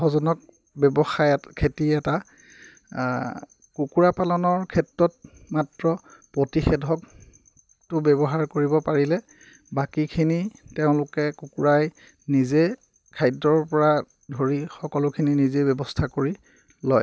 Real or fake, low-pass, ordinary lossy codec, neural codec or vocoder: real; none; none; none